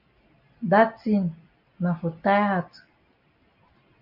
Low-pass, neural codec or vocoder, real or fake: 5.4 kHz; none; real